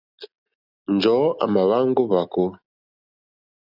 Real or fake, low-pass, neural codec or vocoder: real; 5.4 kHz; none